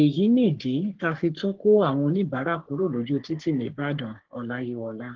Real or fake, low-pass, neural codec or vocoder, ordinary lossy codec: fake; 7.2 kHz; codec, 44.1 kHz, 3.4 kbps, Pupu-Codec; Opus, 16 kbps